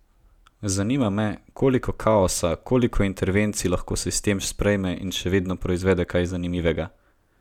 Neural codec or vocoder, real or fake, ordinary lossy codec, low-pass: none; real; none; 19.8 kHz